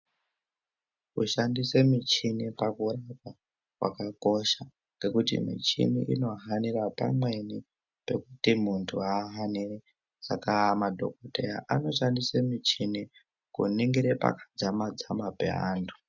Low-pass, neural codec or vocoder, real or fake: 7.2 kHz; none; real